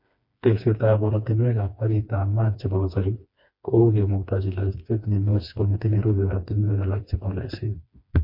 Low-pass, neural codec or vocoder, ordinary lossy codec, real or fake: 5.4 kHz; codec, 16 kHz, 2 kbps, FreqCodec, smaller model; MP3, 32 kbps; fake